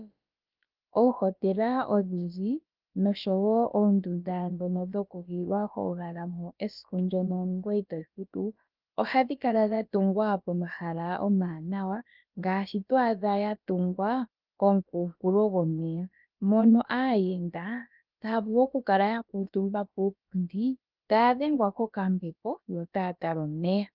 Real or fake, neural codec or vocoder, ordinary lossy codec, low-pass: fake; codec, 16 kHz, about 1 kbps, DyCAST, with the encoder's durations; Opus, 24 kbps; 5.4 kHz